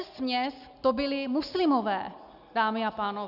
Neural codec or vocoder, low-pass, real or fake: none; 5.4 kHz; real